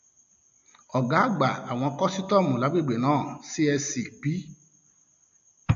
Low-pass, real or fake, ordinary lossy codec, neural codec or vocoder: 7.2 kHz; real; AAC, 96 kbps; none